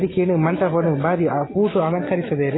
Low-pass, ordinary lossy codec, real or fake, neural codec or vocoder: 7.2 kHz; AAC, 16 kbps; real; none